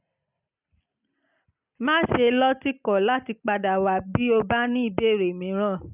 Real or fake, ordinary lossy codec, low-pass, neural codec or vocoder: real; none; 3.6 kHz; none